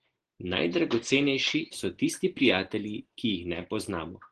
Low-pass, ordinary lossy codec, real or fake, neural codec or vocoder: 9.9 kHz; Opus, 16 kbps; real; none